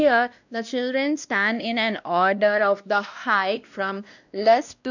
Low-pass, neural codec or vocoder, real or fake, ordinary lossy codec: 7.2 kHz; codec, 16 kHz, 1 kbps, X-Codec, WavLM features, trained on Multilingual LibriSpeech; fake; none